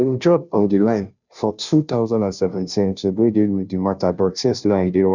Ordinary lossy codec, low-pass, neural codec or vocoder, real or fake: none; 7.2 kHz; codec, 16 kHz, 0.5 kbps, FunCodec, trained on Chinese and English, 25 frames a second; fake